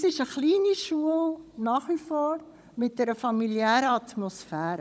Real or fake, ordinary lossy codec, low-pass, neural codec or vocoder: fake; none; none; codec, 16 kHz, 16 kbps, FunCodec, trained on Chinese and English, 50 frames a second